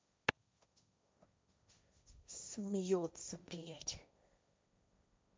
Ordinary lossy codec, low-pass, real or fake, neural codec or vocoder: none; none; fake; codec, 16 kHz, 1.1 kbps, Voila-Tokenizer